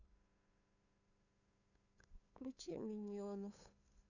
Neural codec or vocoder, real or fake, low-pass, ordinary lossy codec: codec, 16 kHz, 8 kbps, FunCodec, trained on LibriTTS, 25 frames a second; fake; 7.2 kHz; none